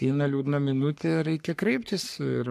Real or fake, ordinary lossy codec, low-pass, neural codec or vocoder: fake; MP3, 96 kbps; 14.4 kHz; codec, 44.1 kHz, 3.4 kbps, Pupu-Codec